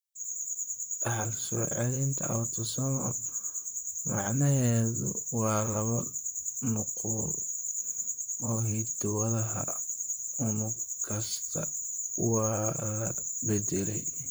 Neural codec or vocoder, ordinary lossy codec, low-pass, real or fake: vocoder, 44.1 kHz, 128 mel bands, Pupu-Vocoder; none; none; fake